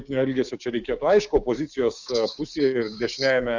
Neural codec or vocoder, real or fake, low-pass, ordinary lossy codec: codec, 16 kHz, 6 kbps, DAC; fake; 7.2 kHz; Opus, 64 kbps